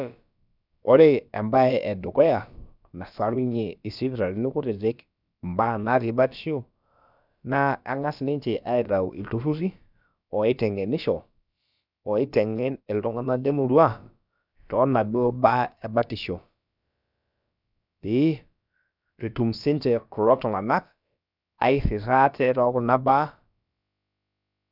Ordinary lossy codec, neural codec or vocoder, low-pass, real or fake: none; codec, 16 kHz, about 1 kbps, DyCAST, with the encoder's durations; 5.4 kHz; fake